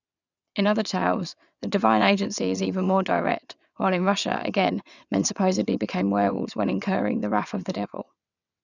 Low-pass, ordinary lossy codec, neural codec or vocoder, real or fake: 7.2 kHz; none; vocoder, 22.05 kHz, 80 mel bands, WaveNeXt; fake